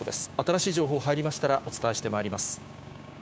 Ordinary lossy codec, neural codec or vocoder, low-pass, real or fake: none; codec, 16 kHz, 6 kbps, DAC; none; fake